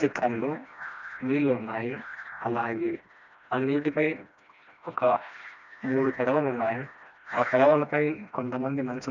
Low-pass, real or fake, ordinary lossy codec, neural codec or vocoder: 7.2 kHz; fake; none; codec, 16 kHz, 1 kbps, FreqCodec, smaller model